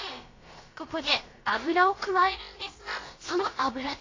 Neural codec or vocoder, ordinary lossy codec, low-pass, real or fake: codec, 16 kHz, about 1 kbps, DyCAST, with the encoder's durations; AAC, 32 kbps; 7.2 kHz; fake